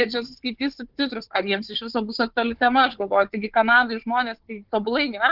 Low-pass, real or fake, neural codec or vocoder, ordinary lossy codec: 5.4 kHz; fake; codec, 16 kHz, 4 kbps, FunCodec, trained on Chinese and English, 50 frames a second; Opus, 16 kbps